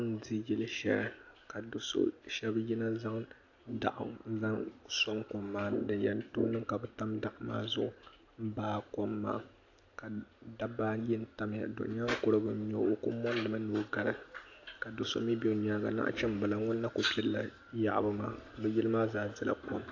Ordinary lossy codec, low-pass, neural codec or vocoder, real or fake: AAC, 48 kbps; 7.2 kHz; none; real